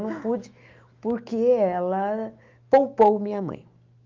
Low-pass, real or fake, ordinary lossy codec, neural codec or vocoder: 7.2 kHz; fake; Opus, 32 kbps; autoencoder, 48 kHz, 128 numbers a frame, DAC-VAE, trained on Japanese speech